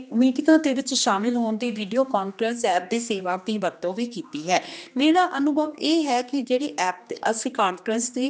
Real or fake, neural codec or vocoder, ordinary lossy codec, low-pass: fake; codec, 16 kHz, 2 kbps, X-Codec, HuBERT features, trained on general audio; none; none